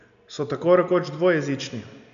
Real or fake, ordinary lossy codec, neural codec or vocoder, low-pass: real; none; none; 7.2 kHz